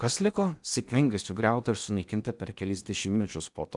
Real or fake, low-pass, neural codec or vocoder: fake; 10.8 kHz; codec, 16 kHz in and 24 kHz out, 0.8 kbps, FocalCodec, streaming, 65536 codes